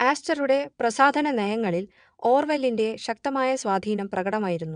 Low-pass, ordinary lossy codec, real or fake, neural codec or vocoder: 9.9 kHz; none; fake; vocoder, 22.05 kHz, 80 mel bands, WaveNeXt